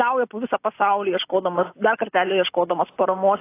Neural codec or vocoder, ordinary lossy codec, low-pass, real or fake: none; AAC, 24 kbps; 3.6 kHz; real